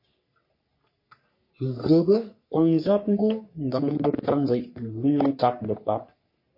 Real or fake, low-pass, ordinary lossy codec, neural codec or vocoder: fake; 5.4 kHz; MP3, 32 kbps; codec, 44.1 kHz, 3.4 kbps, Pupu-Codec